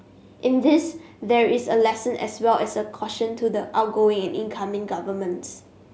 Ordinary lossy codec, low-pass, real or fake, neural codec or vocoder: none; none; real; none